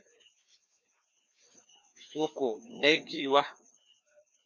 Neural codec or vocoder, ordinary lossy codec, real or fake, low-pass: codec, 16 kHz, 2 kbps, FreqCodec, larger model; MP3, 48 kbps; fake; 7.2 kHz